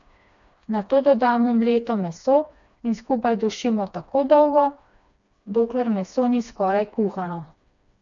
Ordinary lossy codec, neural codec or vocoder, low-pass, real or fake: none; codec, 16 kHz, 2 kbps, FreqCodec, smaller model; 7.2 kHz; fake